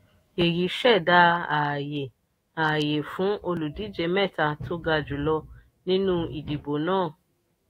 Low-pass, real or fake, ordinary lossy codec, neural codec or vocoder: 19.8 kHz; fake; AAC, 48 kbps; autoencoder, 48 kHz, 128 numbers a frame, DAC-VAE, trained on Japanese speech